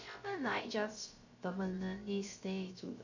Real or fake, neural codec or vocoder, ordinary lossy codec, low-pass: fake; codec, 16 kHz, 0.3 kbps, FocalCodec; none; 7.2 kHz